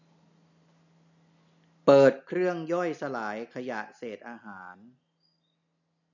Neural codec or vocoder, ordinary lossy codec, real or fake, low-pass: none; none; real; 7.2 kHz